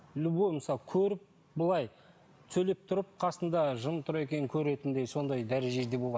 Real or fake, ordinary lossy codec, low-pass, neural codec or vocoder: real; none; none; none